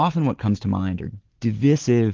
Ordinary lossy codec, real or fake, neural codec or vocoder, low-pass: Opus, 16 kbps; real; none; 7.2 kHz